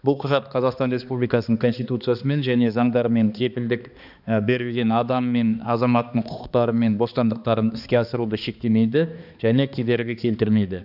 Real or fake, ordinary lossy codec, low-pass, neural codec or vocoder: fake; none; 5.4 kHz; codec, 16 kHz, 2 kbps, X-Codec, HuBERT features, trained on balanced general audio